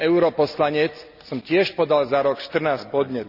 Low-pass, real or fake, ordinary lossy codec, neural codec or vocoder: 5.4 kHz; real; none; none